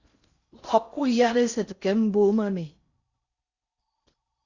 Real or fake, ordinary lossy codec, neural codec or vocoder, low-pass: fake; Opus, 64 kbps; codec, 16 kHz in and 24 kHz out, 0.6 kbps, FocalCodec, streaming, 4096 codes; 7.2 kHz